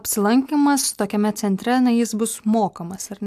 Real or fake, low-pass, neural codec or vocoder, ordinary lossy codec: real; 14.4 kHz; none; AAC, 96 kbps